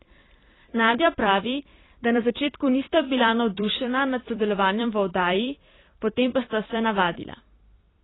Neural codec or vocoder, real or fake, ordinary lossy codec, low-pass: vocoder, 44.1 kHz, 128 mel bands every 256 samples, BigVGAN v2; fake; AAC, 16 kbps; 7.2 kHz